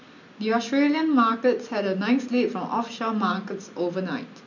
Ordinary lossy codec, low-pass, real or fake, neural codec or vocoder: none; 7.2 kHz; real; none